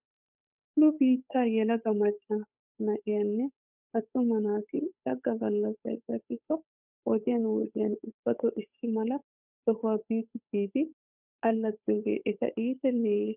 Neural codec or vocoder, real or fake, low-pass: codec, 16 kHz, 8 kbps, FunCodec, trained on Chinese and English, 25 frames a second; fake; 3.6 kHz